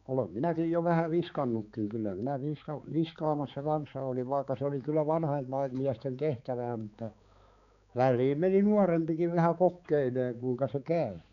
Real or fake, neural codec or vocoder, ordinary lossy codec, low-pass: fake; codec, 16 kHz, 4 kbps, X-Codec, HuBERT features, trained on balanced general audio; none; 7.2 kHz